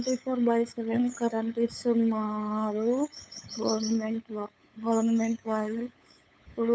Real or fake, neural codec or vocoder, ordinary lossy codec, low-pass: fake; codec, 16 kHz, 8 kbps, FunCodec, trained on LibriTTS, 25 frames a second; none; none